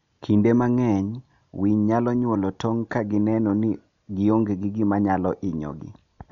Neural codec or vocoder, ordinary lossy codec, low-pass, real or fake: none; none; 7.2 kHz; real